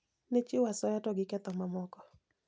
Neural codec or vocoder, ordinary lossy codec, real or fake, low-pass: none; none; real; none